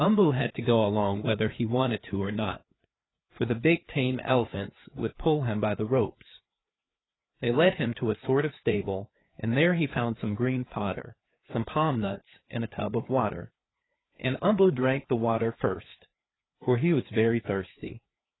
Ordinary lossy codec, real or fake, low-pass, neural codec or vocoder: AAC, 16 kbps; fake; 7.2 kHz; codec, 16 kHz, 4 kbps, FreqCodec, larger model